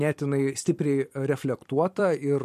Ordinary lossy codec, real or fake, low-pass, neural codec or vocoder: MP3, 64 kbps; fake; 14.4 kHz; vocoder, 44.1 kHz, 128 mel bands every 256 samples, BigVGAN v2